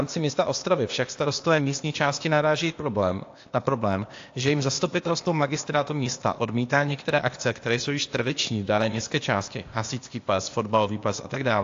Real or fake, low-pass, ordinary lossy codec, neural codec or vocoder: fake; 7.2 kHz; AAC, 48 kbps; codec, 16 kHz, 0.8 kbps, ZipCodec